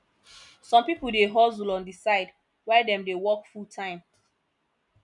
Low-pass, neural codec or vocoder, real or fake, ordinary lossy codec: 10.8 kHz; none; real; none